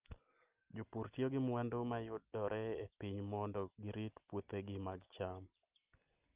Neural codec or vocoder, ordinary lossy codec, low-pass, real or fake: none; Opus, 24 kbps; 3.6 kHz; real